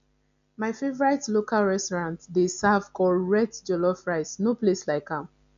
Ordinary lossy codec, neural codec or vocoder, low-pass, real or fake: none; none; 7.2 kHz; real